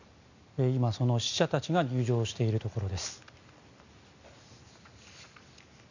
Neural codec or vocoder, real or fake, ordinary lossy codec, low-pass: none; real; none; 7.2 kHz